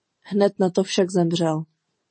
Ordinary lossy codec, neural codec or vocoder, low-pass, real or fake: MP3, 32 kbps; none; 9.9 kHz; real